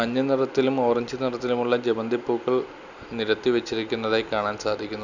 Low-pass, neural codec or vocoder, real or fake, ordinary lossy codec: 7.2 kHz; none; real; none